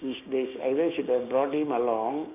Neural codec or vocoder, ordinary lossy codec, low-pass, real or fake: codec, 16 kHz, 6 kbps, DAC; none; 3.6 kHz; fake